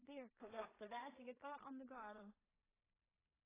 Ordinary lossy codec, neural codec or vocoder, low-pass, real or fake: AAC, 16 kbps; codec, 16 kHz in and 24 kHz out, 0.4 kbps, LongCat-Audio-Codec, two codebook decoder; 3.6 kHz; fake